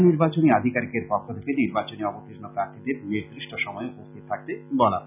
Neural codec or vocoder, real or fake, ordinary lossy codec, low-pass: none; real; none; 3.6 kHz